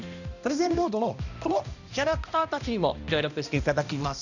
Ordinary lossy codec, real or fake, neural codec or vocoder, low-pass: none; fake; codec, 16 kHz, 1 kbps, X-Codec, HuBERT features, trained on balanced general audio; 7.2 kHz